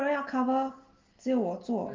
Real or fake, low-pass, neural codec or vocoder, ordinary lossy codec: real; 7.2 kHz; none; Opus, 32 kbps